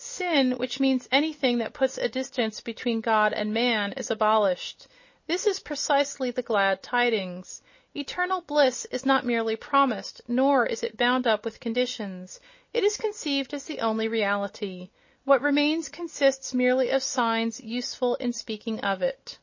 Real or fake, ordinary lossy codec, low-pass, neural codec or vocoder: real; MP3, 32 kbps; 7.2 kHz; none